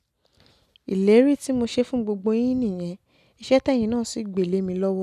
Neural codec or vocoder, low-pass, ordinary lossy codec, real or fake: none; 14.4 kHz; none; real